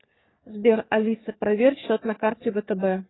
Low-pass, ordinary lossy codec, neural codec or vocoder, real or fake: 7.2 kHz; AAC, 16 kbps; codec, 44.1 kHz, 3.4 kbps, Pupu-Codec; fake